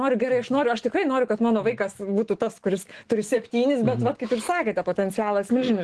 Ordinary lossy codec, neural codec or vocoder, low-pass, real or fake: Opus, 16 kbps; autoencoder, 48 kHz, 128 numbers a frame, DAC-VAE, trained on Japanese speech; 10.8 kHz; fake